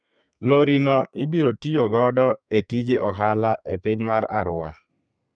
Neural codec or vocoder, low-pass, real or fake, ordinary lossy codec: codec, 32 kHz, 1.9 kbps, SNAC; 9.9 kHz; fake; none